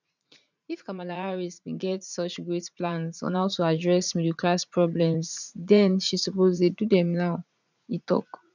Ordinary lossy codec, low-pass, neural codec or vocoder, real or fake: none; 7.2 kHz; vocoder, 44.1 kHz, 80 mel bands, Vocos; fake